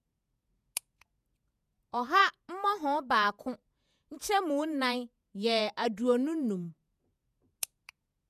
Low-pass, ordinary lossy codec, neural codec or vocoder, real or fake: 14.4 kHz; none; vocoder, 48 kHz, 128 mel bands, Vocos; fake